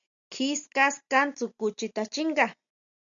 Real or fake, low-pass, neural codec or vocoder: real; 7.2 kHz; none